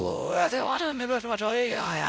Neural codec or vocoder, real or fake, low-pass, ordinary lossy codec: codec, 16 kHz, 0.5 kbps, X-Codec, WavLM features, trained on Multilingual LibriSpeech; fake; none; none